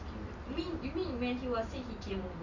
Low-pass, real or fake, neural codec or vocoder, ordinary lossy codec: 7.2 kHz; real; none; Opus, 64 kbps